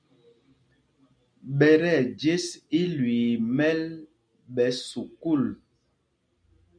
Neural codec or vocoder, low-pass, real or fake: none; 9.9 kHz; real